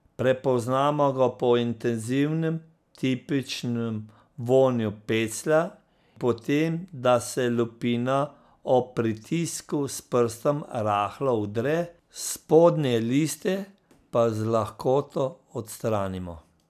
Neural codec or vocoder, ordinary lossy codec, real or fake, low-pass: none; none; real; 14.4 kHz